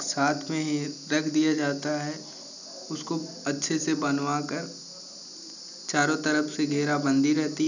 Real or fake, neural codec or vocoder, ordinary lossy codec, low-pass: real; none; none; 7.2 kHz